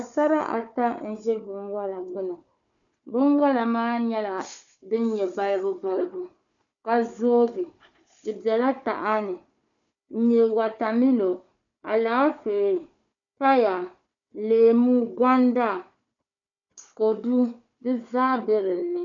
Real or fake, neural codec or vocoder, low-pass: fake; codec, 16 kHz, 4 kbps, FunCodec, trained on Chinese and English, 50 frames a second; 7.2 kHz